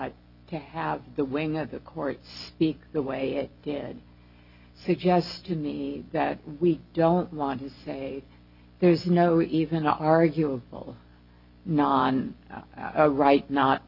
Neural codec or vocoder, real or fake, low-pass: none; real; 5.4 kHz